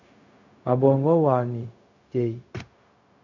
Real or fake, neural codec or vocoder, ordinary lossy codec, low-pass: fake; codec, 16 kHz, 0.4 kbps, LongCat-Audio-Codec; AAC, 48 kbps; 7.2 kHz